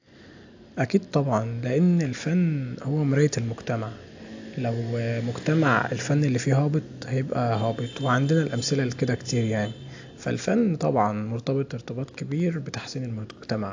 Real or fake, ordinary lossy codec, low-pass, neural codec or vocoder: real; AAC, 48 kbps; 7.2 kHz; none